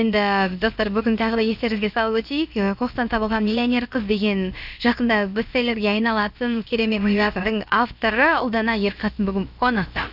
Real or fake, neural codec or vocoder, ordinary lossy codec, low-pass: fake; codec, 16 kHz, about 1 kbps, DyCAST, with the encoder's durations; none; 5.4 kHz